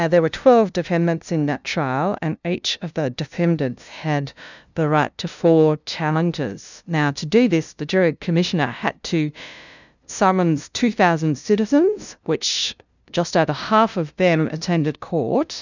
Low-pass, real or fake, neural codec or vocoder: 7.2 kHz; fake; codec, 16 kHz, 0.5 kbps, FunCodec, trained on LibriTTS, 25 frames a second